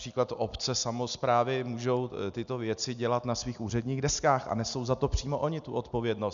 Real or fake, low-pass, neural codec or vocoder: real; 7.2 kHz; none